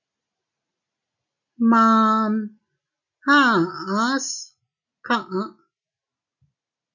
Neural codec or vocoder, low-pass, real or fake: none; 7.2 kHz; real